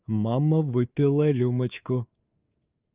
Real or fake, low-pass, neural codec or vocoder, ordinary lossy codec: fake; 3.6 kHz; codec, 24 kHz, 3.1 kbps, DualCodec; Opus, 24 kbps